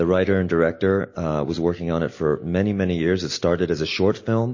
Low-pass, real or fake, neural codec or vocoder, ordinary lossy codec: 7.2 kHz; real; none; MP3, 32 kbps